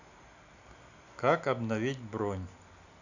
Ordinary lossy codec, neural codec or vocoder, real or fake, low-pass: none; none; real; 7.2 kHz